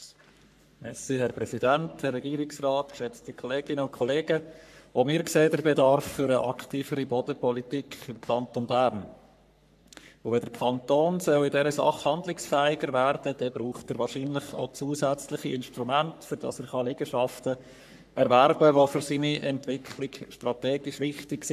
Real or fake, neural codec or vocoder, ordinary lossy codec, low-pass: fake; codec, 44.1 kHz, 3.4 kbps, Pupu-Codec; none; 14.4 kHz